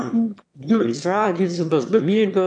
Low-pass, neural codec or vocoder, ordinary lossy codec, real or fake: 9.9 kHz; autoencoder, 22.05 kHz, a latent of 192 numbers a frame, VITS, trained on one speaker; MP3, 64 kbps; fake